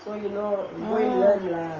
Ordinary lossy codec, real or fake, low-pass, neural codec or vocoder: Opus, 24 kbps; real; 7.2 kHz; none